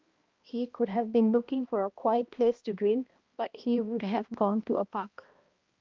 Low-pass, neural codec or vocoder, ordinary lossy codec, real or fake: 7.2 kHz; codec, 16 kHz, 1 kbps, X-Codec, HuBERT features, trained on balanced general audio; Opus, 24 kbps; fake